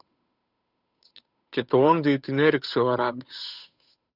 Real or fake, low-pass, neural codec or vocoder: fake; 5.4 kHz; codec, 16 kHz, 8 kbps, FunCodec, trained on Chinese and English, 25 frames a second